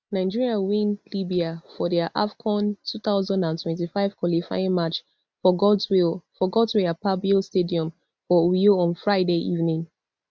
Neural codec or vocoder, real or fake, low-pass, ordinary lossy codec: none; real; none; none